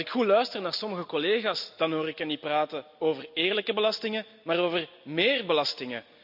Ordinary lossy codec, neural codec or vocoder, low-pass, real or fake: MP3, 48 kbps; none; 5.4 kHz; real